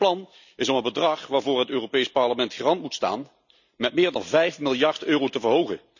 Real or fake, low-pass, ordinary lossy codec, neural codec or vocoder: real; 7.2 kHz; none; none